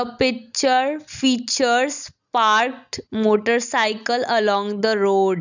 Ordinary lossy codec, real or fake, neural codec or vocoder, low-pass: none; real; none; 7.2 kHz